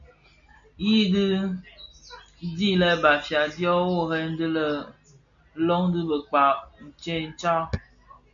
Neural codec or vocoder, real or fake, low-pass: none; real; 7.2 kHz